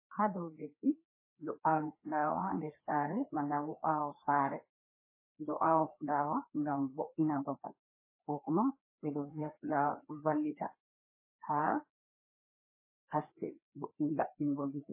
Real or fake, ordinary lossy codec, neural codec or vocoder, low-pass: fake; MP3, 16 kbps; codec, 16 kHz, 2 kbps, FreqCodec, larger model; 3.6 kHz